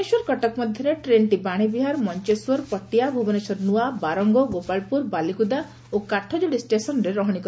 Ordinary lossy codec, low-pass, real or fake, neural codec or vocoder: none; none; real; none